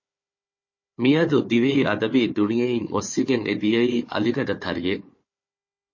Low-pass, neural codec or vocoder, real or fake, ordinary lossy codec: 7.2 kHz; codec, 16 kHz, 4 kbps, FunCodec, trained on Chinese and English, 50 frames a second; fake; MP3, 32 kbps